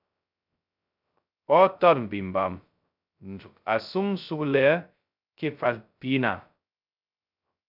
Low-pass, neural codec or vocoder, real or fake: 5.4 kHz; codec, 16 kHz, 0.2 kbps, FocalCodec; fake